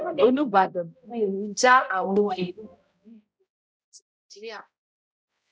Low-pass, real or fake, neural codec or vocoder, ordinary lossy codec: none; fake; codec, 16 kHz, 0.5 kbps, X-Codec, HuBERT features, trained on balanced general audio; none